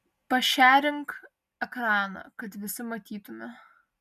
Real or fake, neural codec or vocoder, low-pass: real; none; 14.4 kHz